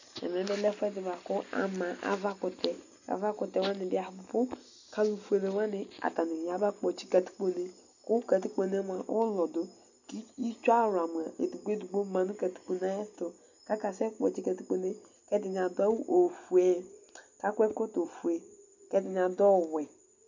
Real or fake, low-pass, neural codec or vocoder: real; 7.2 kHz; none